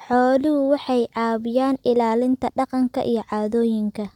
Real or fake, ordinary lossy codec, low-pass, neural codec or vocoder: real; none; 19.8 kHz; none